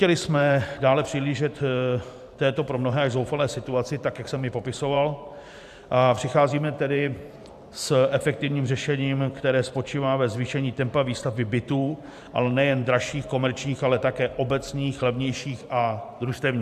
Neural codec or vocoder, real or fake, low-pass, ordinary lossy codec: vocoder, 44.1 kHz, 128 mel bands every 512 samples, BigVGAN v2; fake; 14.4 kHz; AAC, 96 kbps